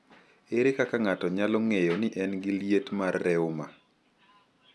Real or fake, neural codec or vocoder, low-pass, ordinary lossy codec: real; none; none; none